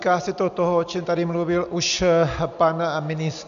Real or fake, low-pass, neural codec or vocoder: real; 7.2 kHz; none